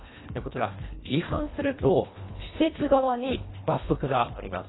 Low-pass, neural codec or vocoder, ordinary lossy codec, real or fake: 7.2 kHz; codec, 24 kHz, 1.5 kbps, HILCodec; AAC, 16 kbps; fake